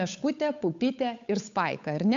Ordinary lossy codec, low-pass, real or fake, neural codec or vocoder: MP3, 64 kbps; 7.2 kHz; fake; codec, 16 kHz, 8 kbps, FunCodec, trained on Chinese and English, 25 frames a second